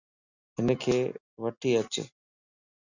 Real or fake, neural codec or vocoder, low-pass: real; none; 7.2 kHz